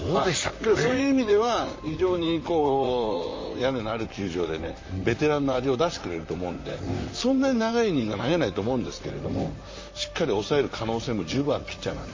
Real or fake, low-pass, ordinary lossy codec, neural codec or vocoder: fake; 7.2 kHz; MP3, 32 kbps; vocoder, 44.1 kHz, 128 mel bands, Pupu-Vocoder